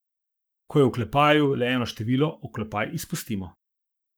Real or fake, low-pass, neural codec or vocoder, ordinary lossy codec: fake; none; codec, 44.1 kHz, 7.8 kbps, Pupu-Codec; none